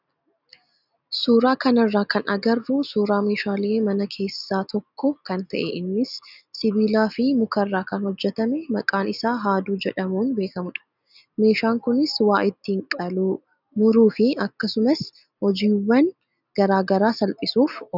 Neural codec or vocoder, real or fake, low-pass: none; real; 5.4 kHz